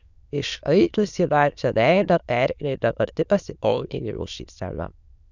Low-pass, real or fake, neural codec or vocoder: 7.2 kHz; fake; autoencoder, 22.05 kHz, a latent of 192 numbers a frame, VITS, trained on many speakers